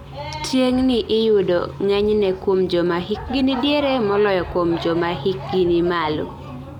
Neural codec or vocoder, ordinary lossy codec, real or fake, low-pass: none; none; real; 19.8 kHz